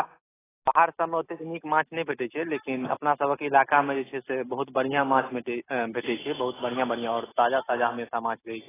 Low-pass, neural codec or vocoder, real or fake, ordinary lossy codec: 3.6 kHz; none; real; AAC, 16 kbps